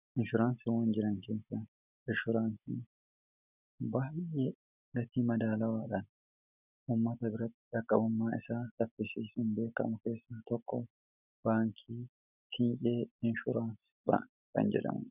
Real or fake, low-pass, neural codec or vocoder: real; 3.6 kHz; none